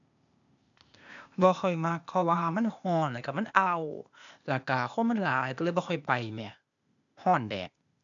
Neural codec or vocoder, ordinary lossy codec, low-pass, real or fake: codec, 16 kHz, 0.8 kbps, ZipCodec; none; 7.2 kHz; fake